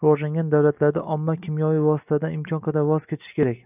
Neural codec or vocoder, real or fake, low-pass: none; real; 3.6 kHz